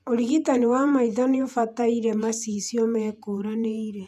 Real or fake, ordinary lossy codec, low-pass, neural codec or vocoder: fake; none; 14.4 kHz; vocoder, 48 kHz, 128 mel bands, Vocos